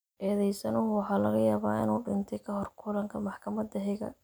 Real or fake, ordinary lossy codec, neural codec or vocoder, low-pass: real; none; none; none